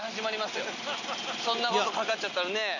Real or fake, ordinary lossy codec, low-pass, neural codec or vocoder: real; none; 7.2 kHz; none